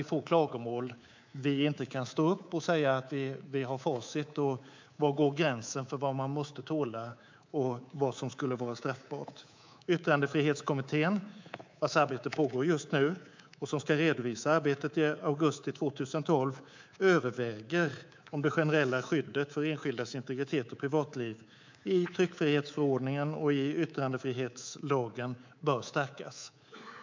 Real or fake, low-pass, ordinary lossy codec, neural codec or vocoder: fake; 7.2 kHz; MP3, 64 kbps; codec, 24 kHz, 3.1 kbps, DualCodec